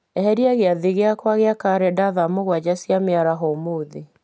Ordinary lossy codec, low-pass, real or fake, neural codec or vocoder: none; none; real; none